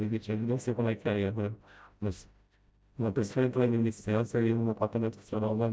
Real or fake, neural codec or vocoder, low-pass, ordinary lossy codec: fake; codec, 16 kHz, 0.5 kbps, FreqCodec, smaller model; none; none